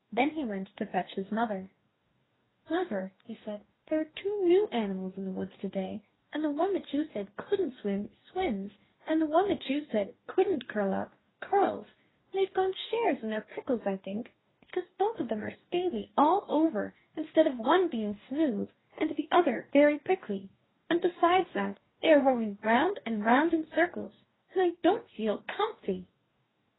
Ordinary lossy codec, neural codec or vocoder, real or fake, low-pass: AAC, 16 kbps; codec, 44.1 kHz, 2.6 kbps, DAC; fake; 7.2 kHz